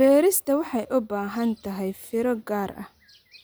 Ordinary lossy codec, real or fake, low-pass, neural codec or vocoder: none; real; none; none